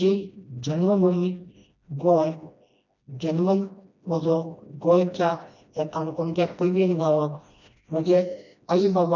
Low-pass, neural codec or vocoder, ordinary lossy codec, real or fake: 7.2 kHz; codec, 16 kHz, 1 kbps, FreqCodec, smaller model; none; fake